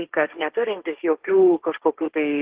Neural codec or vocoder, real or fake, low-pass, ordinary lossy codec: codec, 16 kHz, 1.1 kbps, Voila-Tokenizer; fake; 3.6 kHz; Opus, 16 kbps